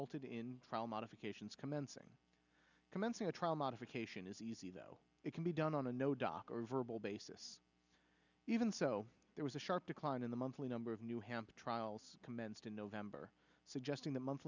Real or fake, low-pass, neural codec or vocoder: real; 7.2 kHz; none